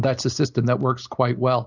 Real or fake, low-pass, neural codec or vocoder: real; 7.2 kHz; none